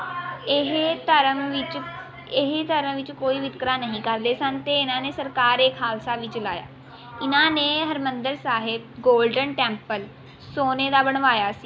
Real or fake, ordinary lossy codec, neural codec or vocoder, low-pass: real; none; none; none